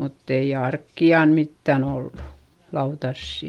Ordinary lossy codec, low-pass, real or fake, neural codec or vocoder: Opus, 24 kbps; 19.8 kHz; real; none